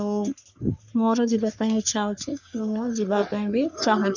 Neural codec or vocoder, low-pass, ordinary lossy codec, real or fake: codec, 44.1 kHz, 3.4 kbps, Pupu-Codec; 7.2 kHz; none; fake